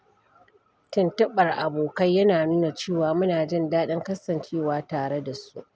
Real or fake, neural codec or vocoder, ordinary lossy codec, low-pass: real; none; none; none